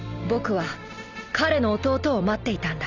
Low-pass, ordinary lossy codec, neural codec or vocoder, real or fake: 7.2 kHz; none; none; real